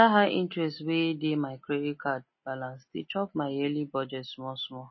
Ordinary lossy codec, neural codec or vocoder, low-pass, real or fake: MP3, 24 kbps; none; 7.2 kHz; real